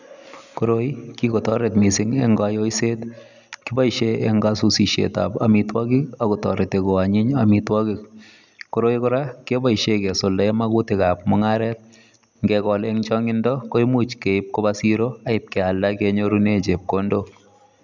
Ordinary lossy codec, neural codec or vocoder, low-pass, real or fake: none; none; 7.2 kHz; real